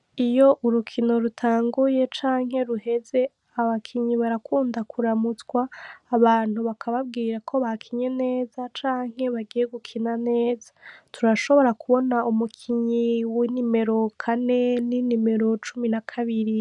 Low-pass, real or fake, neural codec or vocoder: 10.8 kHz; real; none